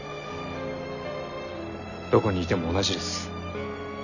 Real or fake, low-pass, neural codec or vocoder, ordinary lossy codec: real; 7.2 kHz; none; none